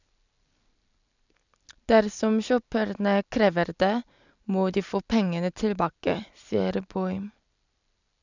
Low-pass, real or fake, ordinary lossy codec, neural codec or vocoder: 7.2 kHz; real; none; none